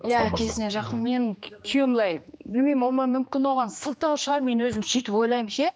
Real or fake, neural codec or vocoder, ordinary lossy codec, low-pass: fake; codec, 16 kHz, 2 kbps, X-Codec, HuBERT features, trained on general audio; none; none